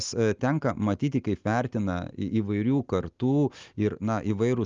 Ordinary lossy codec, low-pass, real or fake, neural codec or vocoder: Opus, 32 kbps; 7.2 kHz; real; none